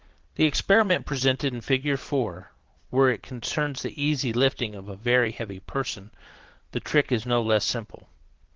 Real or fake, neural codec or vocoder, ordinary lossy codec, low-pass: fake; vocoder, 22.05 kHz, 80 mel bands, Vocos; Opus, 16 kbps; 7.2 kHz